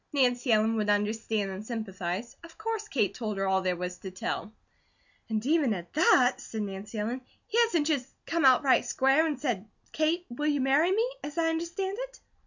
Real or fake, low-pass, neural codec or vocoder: real; 7.2 kHz; none